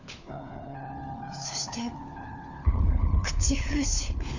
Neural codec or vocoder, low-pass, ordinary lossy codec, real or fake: codec, 16 kHz, 4 kbps, FunCodec, trained on LibriTTS, 50 frames a second; 7.2 kHz; none; fake